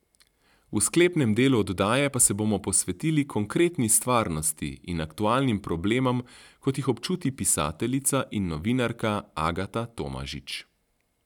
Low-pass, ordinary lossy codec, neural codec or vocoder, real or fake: 19.8 kHz; none; none; real